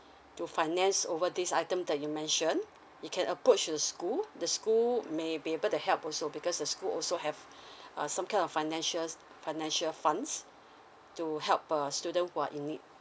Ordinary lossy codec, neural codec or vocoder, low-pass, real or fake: none; none; none; real